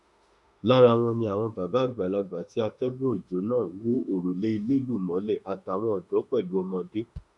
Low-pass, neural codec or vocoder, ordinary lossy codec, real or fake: 10.8 kHz; autoencoder, 48 kHz, 32 numbers a frame, DAC-VAE, trained on Japanese speech; none; fake